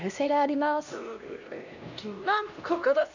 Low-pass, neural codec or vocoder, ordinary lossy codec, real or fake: 7.2 kHz; codec, 16 kHz, 1 kbps, X-Codec, HuBERT features, trained on LibriSpeech; none; fake